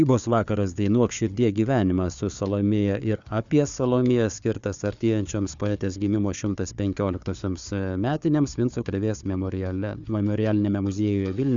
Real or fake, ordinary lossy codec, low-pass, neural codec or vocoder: fake; Opus, 64 kbps; 7.2 kHz; codec, 16 kHz, 4 kbps, FunCodec, trained on Chinese and English, 50 frames a second